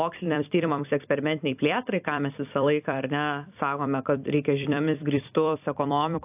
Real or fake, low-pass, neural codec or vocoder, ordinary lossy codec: fake; 3.6 kHz; vocoder, 44.1 kHz, 128 mel bands every 256 samples, BigVGAN v2; AAC, 32 kbps